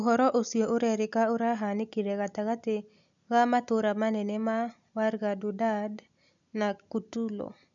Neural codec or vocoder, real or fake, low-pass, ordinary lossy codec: none; real; 7.2 kHz; none